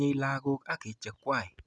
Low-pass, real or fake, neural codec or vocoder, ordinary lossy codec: none; real; none; none